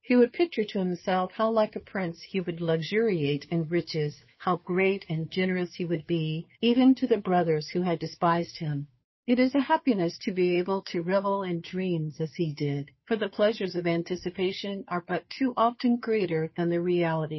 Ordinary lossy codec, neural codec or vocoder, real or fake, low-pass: MP3, 24 kbps; codec, 16 kHz, 2 kbps, FunCodec, trained on Chinese and English, 25 frames a second; fake; 7.2 kHz